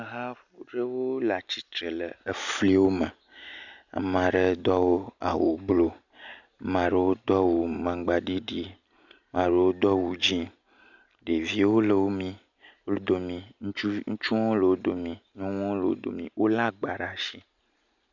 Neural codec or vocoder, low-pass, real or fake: none; 7.2 kHz; real